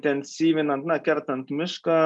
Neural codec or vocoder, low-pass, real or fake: none; 10.8 kHz; real